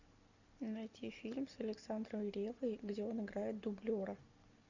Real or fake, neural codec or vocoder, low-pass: real; none; 7.2 kHz